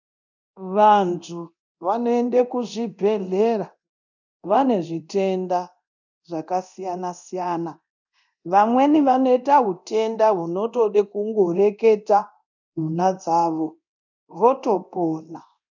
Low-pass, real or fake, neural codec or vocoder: 7.2 kHz; fake; codec, 24 kHz, 0.9 kbps, DualCodec